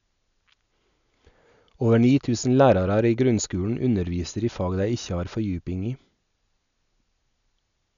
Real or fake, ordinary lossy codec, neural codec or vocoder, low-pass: real; none; none; 7.2 kHz